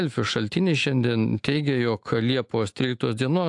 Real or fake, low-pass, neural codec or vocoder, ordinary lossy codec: fake; 10.8 kHz; autoencoder, 48 kHz, 128 numbers a frame, DAC-VAE, trained on Japanese speech; AAC, 64 kbps